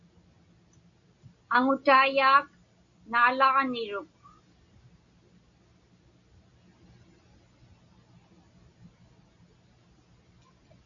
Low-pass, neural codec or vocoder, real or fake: 7.2 kHz; none; real